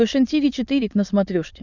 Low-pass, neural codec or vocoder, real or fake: 7.2 kHz; autoencoder, 22.05 kHz, a latent of 192 numbers a frame, VITS, trained on many speakers; fake